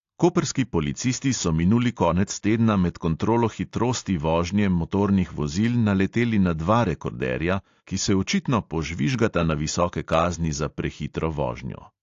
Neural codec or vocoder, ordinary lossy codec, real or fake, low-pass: none; AAC, 48 kbps; real; 7.2 kHz